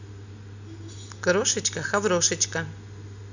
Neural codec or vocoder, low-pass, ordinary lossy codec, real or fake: none; 7.2 kHz; none; real